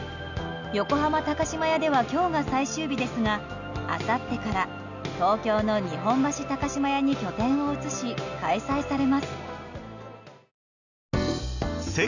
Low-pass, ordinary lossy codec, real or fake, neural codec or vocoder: 7.2 kHz; none; real; none